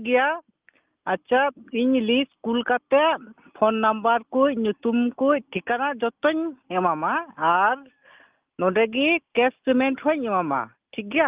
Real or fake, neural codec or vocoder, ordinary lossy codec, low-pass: real; none; Opus, 24 kbps; 3.6 kHz